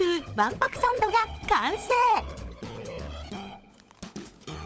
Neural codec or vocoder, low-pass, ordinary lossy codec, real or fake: codec, 16 kHz, 16 kbps, FunCodec, trained on LibriTTS, 50 frames a second; none; none; fake